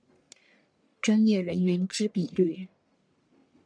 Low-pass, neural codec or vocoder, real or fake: 9.9 kHz; codec, 44.1 kHz, 1.7 kbps, Pupu-Codec; fake